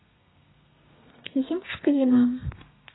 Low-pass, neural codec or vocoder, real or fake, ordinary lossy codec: 7.2 kHz; codec, 32 kHz, 1.9 kbps, SNAC; fake; AAC, 16 kbps